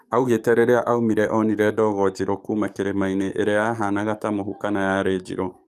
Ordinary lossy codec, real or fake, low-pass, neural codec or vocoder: none; fake; 14.4 kHz; codec, 44.1 kHz, 7.8 kbps, DAC